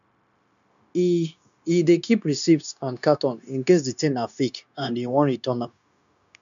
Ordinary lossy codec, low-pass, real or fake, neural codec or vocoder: none; 7.2 kHz; fake; codec, 16 kHz, 0.9 kbps, LongCat-Audio-Codec